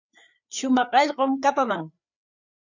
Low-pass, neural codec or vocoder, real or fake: 7.2 kHz; codec, 16 kHz, 4 kbps, FreqCodec, larger model; fake